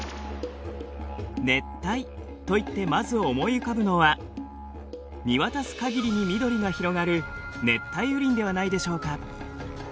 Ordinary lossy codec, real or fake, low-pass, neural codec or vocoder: none; real; none; none